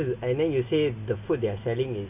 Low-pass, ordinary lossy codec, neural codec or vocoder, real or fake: 3.6 kHz; none; none; real